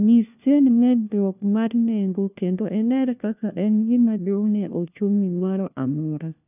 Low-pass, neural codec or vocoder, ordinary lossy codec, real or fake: 3.6 kHz; codec, 16 kHz, 1 kbps, FunCodec, trained on LibriTTS, 50 frames a second; none; fake